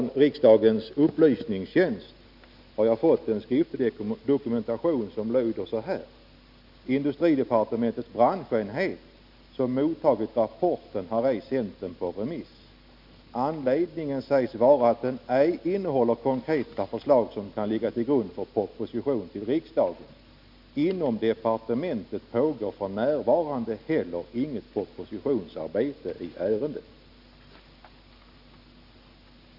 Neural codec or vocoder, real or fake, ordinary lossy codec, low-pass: none; real; none; 5.4 kHz